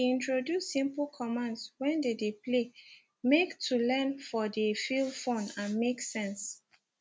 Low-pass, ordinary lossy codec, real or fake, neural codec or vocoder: none; none; real; none